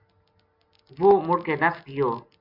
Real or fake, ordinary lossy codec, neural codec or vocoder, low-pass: real; MP3, 48 kbps; none; 5.4 kHz